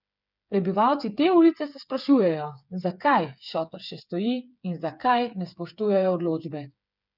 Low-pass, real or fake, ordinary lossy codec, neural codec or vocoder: 5.4 kHz; fake; none; codec, 16 kHz, 8 kbps, FreqCodec, smaller model